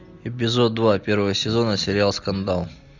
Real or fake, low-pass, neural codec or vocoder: real; 7.2 kHz; none